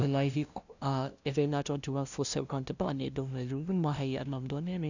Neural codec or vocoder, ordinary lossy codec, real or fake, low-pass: codec, 16 kHz, 0.5 kbps, FunCodec, trained on LibriTTS, 25 frames a second; none; fake; 7.2 kHz